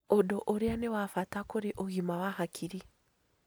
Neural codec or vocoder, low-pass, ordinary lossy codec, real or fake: none; none; none; real